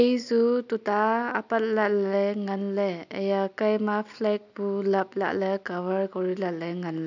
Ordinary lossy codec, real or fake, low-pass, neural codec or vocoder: none; real; 7.2 kHz; none